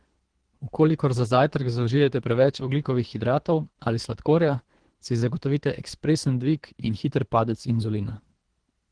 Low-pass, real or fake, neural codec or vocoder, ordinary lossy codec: 9.9 kHz; fake; codec, 24 kHz, 3 kbps, HILCodec; Opus, 16 kbps